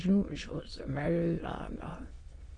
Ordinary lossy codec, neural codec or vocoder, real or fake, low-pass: AAC, 32 kbps; autoencoder, 22.05 kHz, a latent of 192 numbers a frame, VITS, trained on many speakers; fake; 9.9 kHz